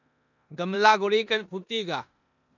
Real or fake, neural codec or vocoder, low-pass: fake; codec, 16 kHz in and 24 kHz out, 0.9 kbps, LongCat-Audio-Codec, four codebook decoder; 7.2 kHz